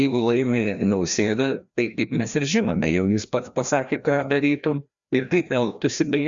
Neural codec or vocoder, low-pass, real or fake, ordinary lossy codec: codec, 16 kHz, 1 kbps, FreqCodec, larger model; 7.2 kHz; fake; Opus, 64 kbps